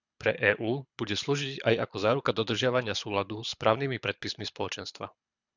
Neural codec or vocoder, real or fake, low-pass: codec, 24 kHz, 6 kbps, HILCodec; fake; 7.2 kHz